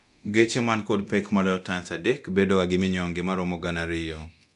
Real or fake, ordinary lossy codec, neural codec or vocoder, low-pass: fake; none; codec, 24 kHz, 0.9 kbps, DualCodec; 10.8 kHz